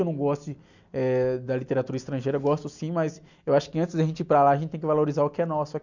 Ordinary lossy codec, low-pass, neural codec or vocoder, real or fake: none; 7.2 kHz; none; real